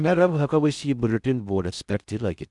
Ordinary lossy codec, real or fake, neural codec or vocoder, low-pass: none; fake; codec, 16 kHz in and 24 kHz out, 0.6 kbps, FocalCodec, streaming, 2048 codes; 10.8 kHz